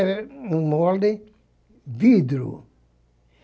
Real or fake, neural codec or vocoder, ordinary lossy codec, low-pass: real; none; none; none